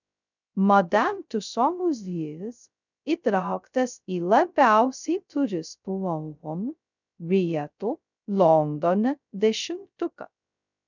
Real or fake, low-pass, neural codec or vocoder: fake; 7.2 kHz; codec, 16 kHz, 0.2 kbps, FocalCodec